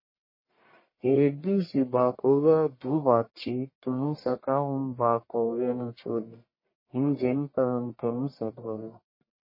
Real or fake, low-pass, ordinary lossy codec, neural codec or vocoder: fake; 5.4 kHz; MP3, 24 kbps; codec, 44.1 kHz, 1.7 kbps, Pupu-Codec